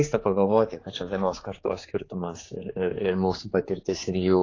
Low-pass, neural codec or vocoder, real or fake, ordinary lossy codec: 7.2 kHz; codec, 16 kHz, 4 kbps, X-Codec, HuBERT features, trained on general audio; fake; AAC, 32 kbps